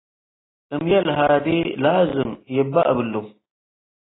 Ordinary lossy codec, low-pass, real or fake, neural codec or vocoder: AAC, 16 kbps; 7.2 kHz; real; none